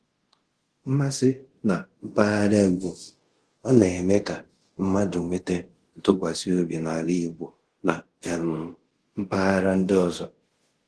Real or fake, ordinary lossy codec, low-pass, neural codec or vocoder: fake; Opus, 16 kbps; 10.8 kHz; codec, 24 kHz, 0.5 kbps, DualCodec